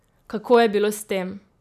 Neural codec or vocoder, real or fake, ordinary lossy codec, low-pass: none; real; none; 14.4 kHz